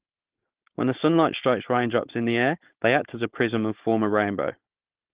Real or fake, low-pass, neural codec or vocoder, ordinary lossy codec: fake; 3.6 kHz; codec, 16 kHz, 4.8 kbps, FACodec; Opus, 16 kbps